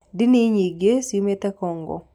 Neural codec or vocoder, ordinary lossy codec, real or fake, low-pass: none; none; real; 19.8 kHz